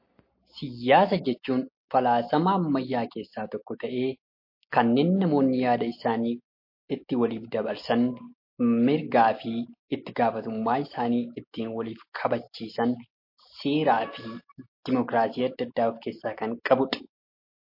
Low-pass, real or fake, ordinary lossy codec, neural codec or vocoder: 5.4 kHz; real; MP3, 32 kbps; none